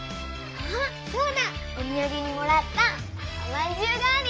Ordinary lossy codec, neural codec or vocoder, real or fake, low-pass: none; none; real; none